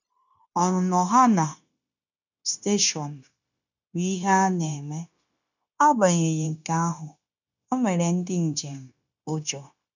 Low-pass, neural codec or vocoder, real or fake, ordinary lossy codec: 7.2 kHz; codec, 16 kHz, 0.9 kbps, LongCat-Audio-Codec; fake; none